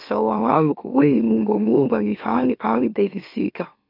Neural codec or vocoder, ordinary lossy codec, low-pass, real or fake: autoencoder, 44.1 kHz, a latent of 192 numbers a frame, MeloTTS; none; 5.4 kHz; fake